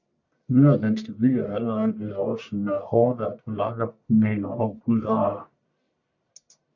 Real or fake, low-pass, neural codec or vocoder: fake; 7.2 kHz; codec, 44.1 kHz, 1.7 kbps, Pupu-Codec